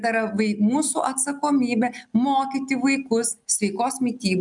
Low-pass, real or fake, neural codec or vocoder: 10.8 kHz; real; none